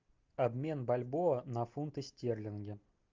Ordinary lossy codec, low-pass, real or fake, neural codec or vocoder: Opus, 24 kbps; 7.2 kHz; real; none